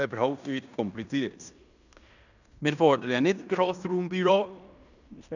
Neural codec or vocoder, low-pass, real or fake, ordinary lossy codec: codec, 16 kHz in and 24 kHz out, 0.9 kbps, LongCat-Audio-Codec, fine tuned four codebook decoder; 7.2 kHz; fake; none